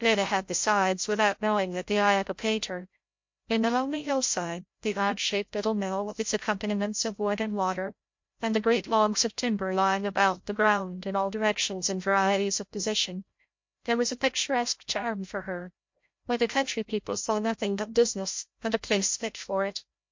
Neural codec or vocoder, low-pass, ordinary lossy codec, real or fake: codec, 16 kHz, 0.5 kbps, FreqCodec, larger model; 7.2 kHz; MP3, 48 kbps; fake